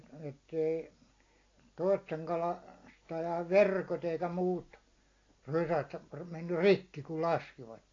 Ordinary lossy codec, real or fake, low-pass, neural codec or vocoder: AAC, 32 kbps; real; 7.2 kHz; none